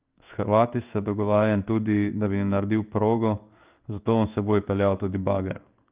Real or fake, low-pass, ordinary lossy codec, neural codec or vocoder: fake; 3.6 kHz; Opus, 32 kbps; codec, 16 kHz in and 24 kHz out, 1 kbps, XY-Tokenizer